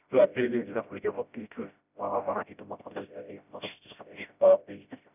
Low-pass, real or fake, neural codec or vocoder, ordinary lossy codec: 3.6 kHz; fake; codec, 16 kHz, 0.5 kbps, FreqCodec, smaller model; none